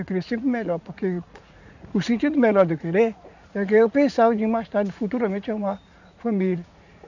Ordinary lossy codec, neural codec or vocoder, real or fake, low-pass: none; vocoder, 44.1 kHz, 80 mel bands, Vocos; fake; 7.2 kHz